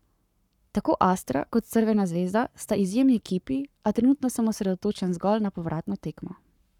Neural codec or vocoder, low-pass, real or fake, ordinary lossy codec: codec, 44.1 kHz, 7.8 kbps, Pupu-Codec; 19.8 kHz; fake; none